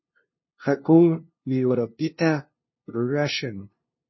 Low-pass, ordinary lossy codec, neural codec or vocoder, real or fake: 7.2 kHz; MP3, 24 kbps; codec, 16 kHz, 0.5 kbps, FunCodec, trained on LibriTTS, 25 frames a second; fake